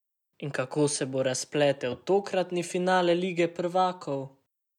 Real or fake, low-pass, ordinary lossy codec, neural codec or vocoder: real; 19.8 kHz; none; none